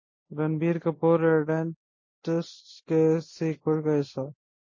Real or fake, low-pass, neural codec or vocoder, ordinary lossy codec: real; 7.2 kHz; none; MP3, 32 kbps